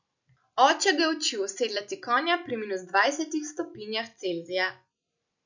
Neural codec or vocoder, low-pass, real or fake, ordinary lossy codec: none; 7.2 kHz; real; none